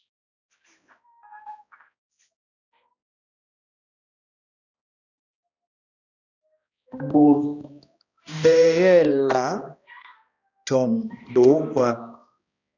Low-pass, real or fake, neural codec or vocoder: 7.2 kHz; fake; codec, 16 kHz, 1 kbps, X-Codec, HuBERT features, trained on balanced general audio